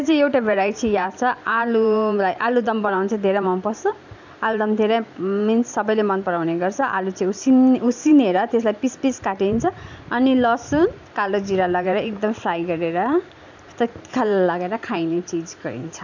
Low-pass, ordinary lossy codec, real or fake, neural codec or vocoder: 7.2 kHz; none; fake; vocoder, 44.1 kHz, 128 mel bands every 512 samples, BigVGAN v2